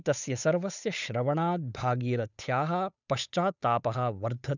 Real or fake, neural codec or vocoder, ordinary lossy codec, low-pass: fake; codec, 16 kHz, 8 kbps, FunCodec, trained on Chinese and English, 25 frames a second; none; 7.2 kHz